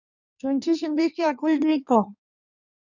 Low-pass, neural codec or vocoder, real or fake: 7.2 kHz; codec, 16 kHz in and 24 kHz out, 1.1 kbps, FireRedTTS-2 codec; fake